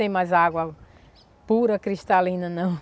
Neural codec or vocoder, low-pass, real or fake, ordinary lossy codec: none; none; real; none